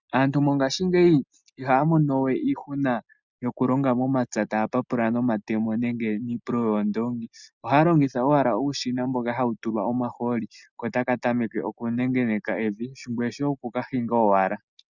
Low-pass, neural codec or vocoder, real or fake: 7.2 kHz; none; real